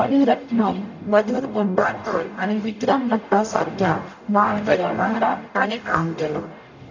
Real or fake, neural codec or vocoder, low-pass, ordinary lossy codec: fake; codec, 44.1 kHz, 0.9 kbps, DAC; 7.2 kHz; none